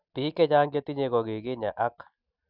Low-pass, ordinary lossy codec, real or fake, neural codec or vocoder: 5.4 kHz; none; real; none